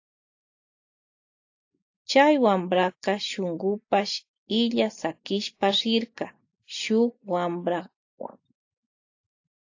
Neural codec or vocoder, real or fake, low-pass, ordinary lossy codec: none; real; 7.2 kHz; AAC, 48 kbps